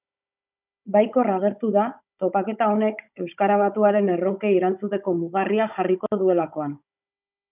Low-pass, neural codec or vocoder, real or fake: 3.6 kHz; codec, 16 kHz, 16 kbps, FunCodec, trained on Chinese and English, 50 frames a second; fake